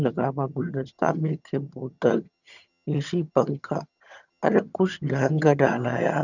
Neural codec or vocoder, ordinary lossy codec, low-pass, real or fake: vocoder, 22.05 kHz, 80 mel bands, HiFi-GAN; none; 7.2 kHz; fake